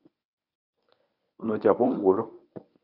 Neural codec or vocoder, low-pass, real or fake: codec, 24 kHz, 0.9 kbps, WavTokenizer, medium speech release version 1; 5.4 kHz; fake